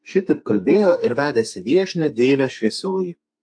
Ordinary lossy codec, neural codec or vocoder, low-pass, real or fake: AAC, 64 kbps; codec, 32 kHz, 1.9 kbps, SNAC; 9.9 kHz; fake